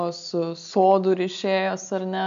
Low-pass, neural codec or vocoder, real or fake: 7.2 kHz; none; real